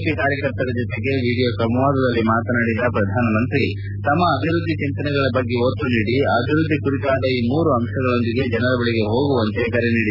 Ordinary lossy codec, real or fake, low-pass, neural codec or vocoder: none; real; 5.4 kHz; none